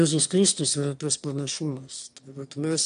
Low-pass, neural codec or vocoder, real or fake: 9.9 kHz; autoencoder, 22.05 kHz, a latent of 192 numbers a frame, VITS, trained on one speaker; fake